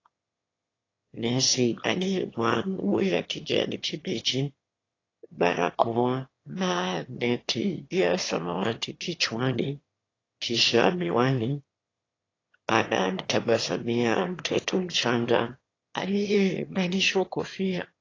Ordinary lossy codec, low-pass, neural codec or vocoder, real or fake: AAC, 32 kbps; 7.2 kHz; autoencoder, 22.05 kHz, a latent of 192 numbers a frame, VITS, trained on one speaker; fake